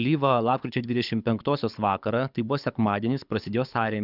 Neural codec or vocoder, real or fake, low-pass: codec, 24 kHz, 6 kbps, HILCodec; fake; 5.4 kHz